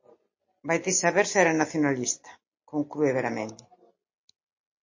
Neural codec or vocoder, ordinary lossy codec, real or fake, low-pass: none; MP3, 32 kbps; real; 7.2 kHz